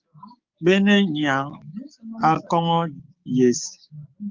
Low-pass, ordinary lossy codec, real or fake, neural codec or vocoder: 7.2 kHz; Opus, 24 kbps; fake; codec, 16 kHz, 6 kbps, DAC